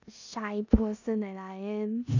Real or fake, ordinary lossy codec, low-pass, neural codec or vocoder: fake; none; 7.2 kHz; codec, 16 kHz in and 24 kHz out, 0.9 kbps, LongCat-Audio-Codec, four codebook decoder